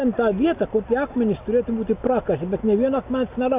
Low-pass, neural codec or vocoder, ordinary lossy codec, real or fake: 3.6 kHz; none; AAC, 32 kbps; real